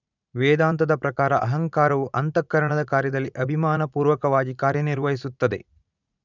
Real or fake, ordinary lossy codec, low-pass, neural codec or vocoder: fake; none; 7.2 kHz; vocoder, 44.1 kHz, 80 mel bands, Vocos